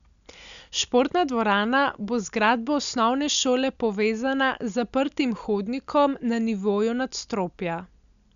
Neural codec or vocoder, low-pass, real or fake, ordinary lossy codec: none; 7.2 kHz; real; none